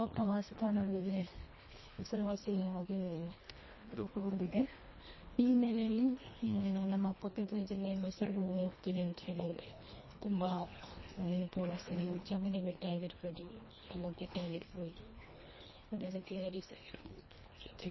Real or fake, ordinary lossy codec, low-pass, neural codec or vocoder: fake; MP3, 24 kbps; 7.2 kHz; codec, 24 kHz, 1.5 kbps, HILCodec